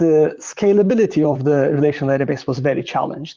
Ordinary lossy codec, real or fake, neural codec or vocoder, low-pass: Opus, 32 kbps; fake; vocoder, 44.1 kHz, 128 mel bands, Pupu-Vocoder; 7.2 kHz